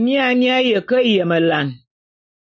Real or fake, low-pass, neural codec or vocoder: real; 7.2 kHz; none